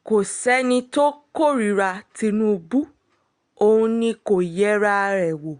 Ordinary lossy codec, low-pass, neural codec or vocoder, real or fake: Opus, 64 kbps; 9.9 kHz; none; real